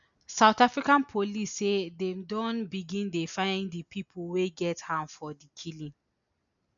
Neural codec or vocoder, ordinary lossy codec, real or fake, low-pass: none; none; real; 7.2 kHz